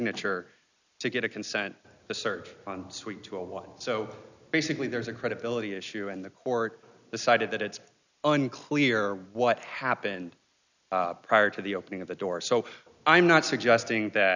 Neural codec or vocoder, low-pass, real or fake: none; 7.2 kHz; real